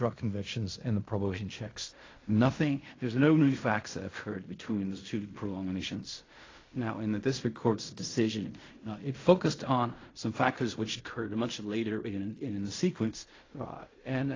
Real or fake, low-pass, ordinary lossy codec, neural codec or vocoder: fake; 7.2 kHz; AAC, 32 kbps; codec, 16 kHz in and 24 kHz out, 0.4 kbps, LongCat-Audio-Codec, fine tuned four codebook decoder